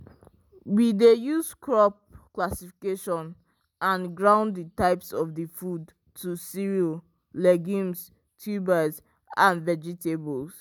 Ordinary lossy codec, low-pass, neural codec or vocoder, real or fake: none; none; none; real